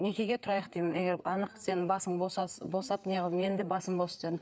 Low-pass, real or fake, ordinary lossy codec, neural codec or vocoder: none; fake; none; codec, 16 kHz, 4 kbps, FreqCodec, larger model